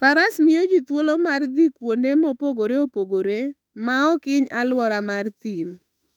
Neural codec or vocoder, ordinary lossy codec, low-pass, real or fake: autoencoder, 48 kHz, 32 numbers a frame, DAC-VAE, trained on Japanese speech; none; 19.8 kHz; fake